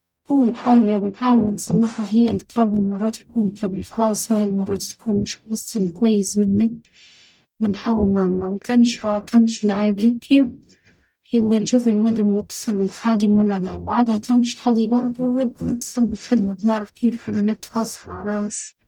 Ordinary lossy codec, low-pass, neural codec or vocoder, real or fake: none; 19.8 kHz; codec, 44.1 kHz, 0.9 kbps, DAC; fake